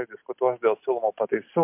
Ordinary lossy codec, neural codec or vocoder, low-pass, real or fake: MP3, 32 kbps; none; 3.6 kHz; real